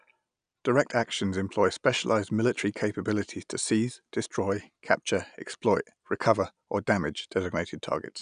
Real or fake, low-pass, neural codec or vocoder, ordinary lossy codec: real; 9.9 kHz; none; none